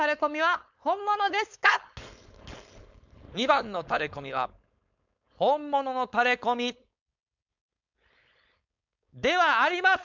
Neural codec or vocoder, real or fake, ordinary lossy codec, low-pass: codec, 16 kHz, 4.8 kbps, FACodec; fake; none; 7.2 kHz